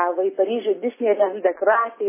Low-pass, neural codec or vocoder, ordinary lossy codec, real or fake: 3.6 kHz; none; MP3, 16 kbps; real